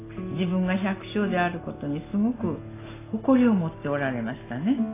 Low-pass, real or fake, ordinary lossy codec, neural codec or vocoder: 3.6 kHz; real; AAC, 16 kbps; none